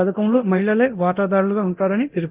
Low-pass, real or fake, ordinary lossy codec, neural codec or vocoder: 3.6 kHz; fake; Opus, 32 kbps; codec, 24 kHz, 0.9 kbps, DualCodec